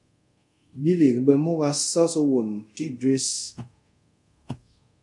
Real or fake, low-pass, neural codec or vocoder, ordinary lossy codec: fake; 10.8 kHz; codec, 24 kHz, 0.5 kbps, DualCodec; MP3, 64 kbps